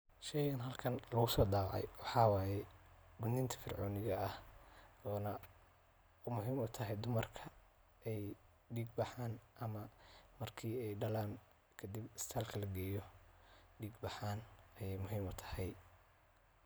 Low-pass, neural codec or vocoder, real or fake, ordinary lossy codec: none; none; real; none